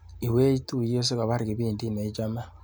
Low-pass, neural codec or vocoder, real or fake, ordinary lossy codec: none; none; real; none